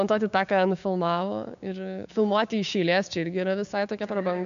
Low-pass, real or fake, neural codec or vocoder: 7.2 kHz; fake; codec, 16 kHz, 6 kbps, DAC